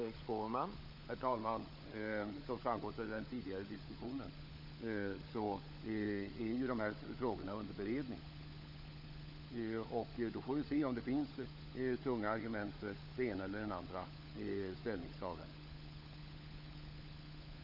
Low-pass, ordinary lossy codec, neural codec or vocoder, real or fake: 5.4 kHz; none; codec, 16 kHz, 16 kbps, FunCodec, trained on LibriTTS, 50 frames a second; fake